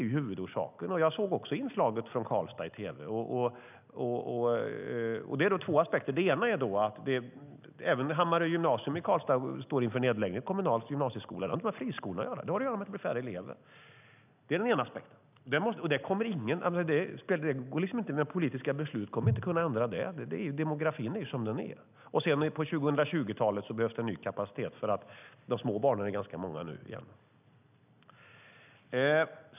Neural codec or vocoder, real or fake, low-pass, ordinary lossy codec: none; real; 3.6 kHz; none